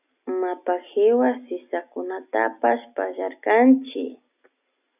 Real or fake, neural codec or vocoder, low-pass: real; none; 3.6 kHz